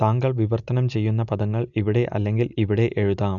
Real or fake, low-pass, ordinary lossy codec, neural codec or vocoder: real; 7.2 kHz; none; none